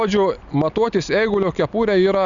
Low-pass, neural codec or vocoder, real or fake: 7.2 kHz; none; real